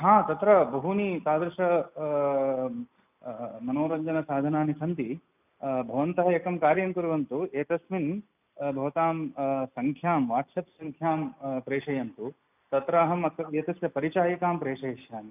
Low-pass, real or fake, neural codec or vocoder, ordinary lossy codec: 3.6 kHz; real; none; none